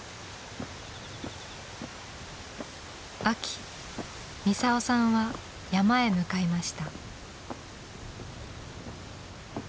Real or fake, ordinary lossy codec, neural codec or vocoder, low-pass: real; none; none; none